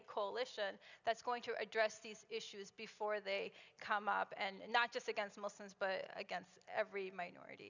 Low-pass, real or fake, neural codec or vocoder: 7.2 kHz; real; none